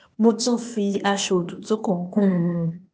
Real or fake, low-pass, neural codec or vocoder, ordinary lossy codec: fake; none; codec, 16 kHz, 0.8 kbps, ZipCodec; none